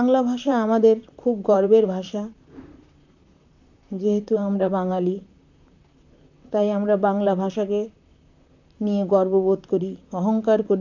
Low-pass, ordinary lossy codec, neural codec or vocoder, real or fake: 7.2 kHz; none; vocoder, 44.1 kHz, 128 mel bands, Pupu-Vocoder; fake